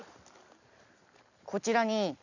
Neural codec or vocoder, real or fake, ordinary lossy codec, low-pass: none; real; none; 7.2 kHz